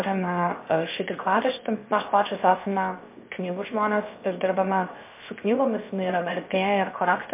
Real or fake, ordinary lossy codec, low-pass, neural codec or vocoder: fake; MP3, 24 kbps; 3.6 kHz; codec, 16 kHz, 0.7 kbps, FocalCodec